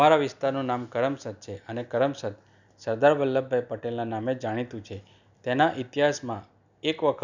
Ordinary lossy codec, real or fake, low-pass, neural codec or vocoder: none; real; 7.2 kHz; none